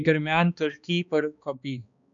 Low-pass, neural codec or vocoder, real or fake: 7.2 kHz; codec, 16 kHz, 2 kbps, X-Codec, HuBERT features, trained on balanced general audio; fake